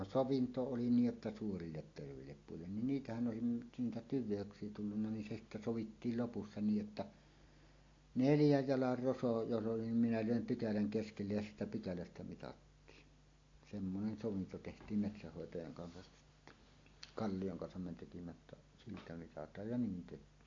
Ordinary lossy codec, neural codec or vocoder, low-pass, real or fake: AAC, 48 kbps; none; 7.2 kHz; real